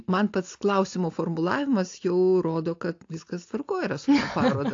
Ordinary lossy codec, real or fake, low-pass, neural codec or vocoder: AAC, 48 kbps; real; 7.2 kHz; none